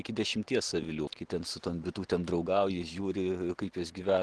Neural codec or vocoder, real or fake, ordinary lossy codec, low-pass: none; real; Opus, 16 kbps; 10.8 kHz